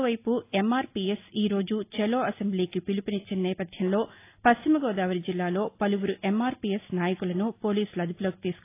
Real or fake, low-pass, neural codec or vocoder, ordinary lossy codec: real; 3.6 kHz; none; AAC, 24 kbps